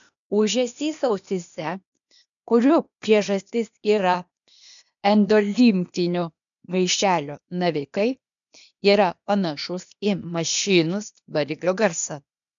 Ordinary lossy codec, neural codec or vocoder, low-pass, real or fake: MP3, 96 kbps; codec, 16 kHz, 0.8 kbps, ZipCodec; 7.2 kHz; fake